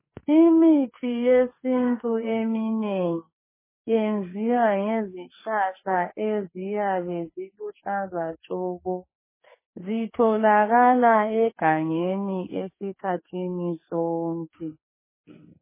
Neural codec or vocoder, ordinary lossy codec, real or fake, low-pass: codec, 44.1 kHz, 2.6 kbps, SNAC; MP3, 16 kbps; fake; 3.6 kHz